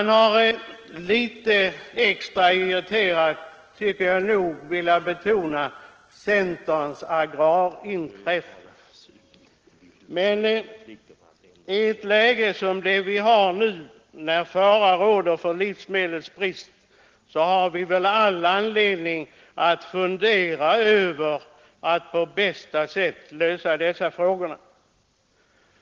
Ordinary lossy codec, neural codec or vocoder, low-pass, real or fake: Opus, 16 kbps; none; 7.2 kHz; real